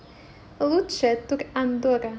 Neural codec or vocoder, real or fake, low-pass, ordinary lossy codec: none; real; none; none